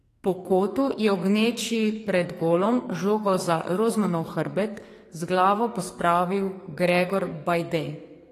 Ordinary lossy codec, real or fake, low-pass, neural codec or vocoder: AAC, 48 kbps; fake; 14.4 kHz; codec, 44.1 kHz, 2.6 kbps, SNAC